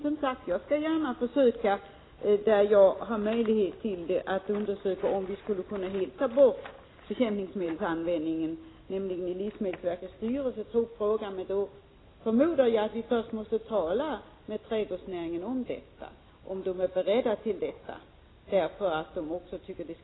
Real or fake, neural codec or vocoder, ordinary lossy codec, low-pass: real; none; AAC, 16 kbps; 7.2 kHz